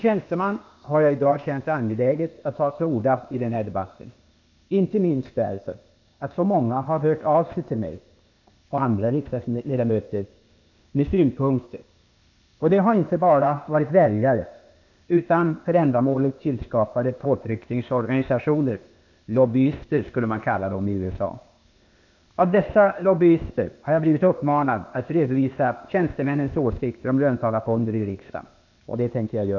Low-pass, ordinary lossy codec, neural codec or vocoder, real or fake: 7.2 kHz; none; codec, 16 kHz, 0.8 kbps, ZipCodec; fake